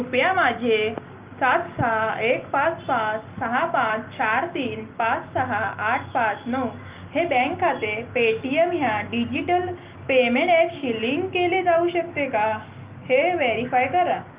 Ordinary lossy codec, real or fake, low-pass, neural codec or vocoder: Opus, 32 kbps; real; 3.6 kHz; none